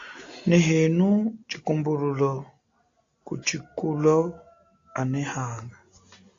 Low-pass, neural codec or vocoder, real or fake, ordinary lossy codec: 7.2 kHz; none; real; AAC, 48 kbps